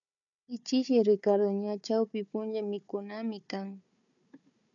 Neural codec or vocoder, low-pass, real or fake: codec, 16 kHz, 4 kbps, FunCodec, trained on Chinese and English, 50 frames a second; 7.2 kHz; fake